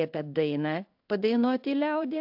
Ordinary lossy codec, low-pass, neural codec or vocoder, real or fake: AAC, 48 kbps; 5.4 kHz; codec, 16 kHz in and 24 kHz out, 1 kbps, XY-Tokenizer; fake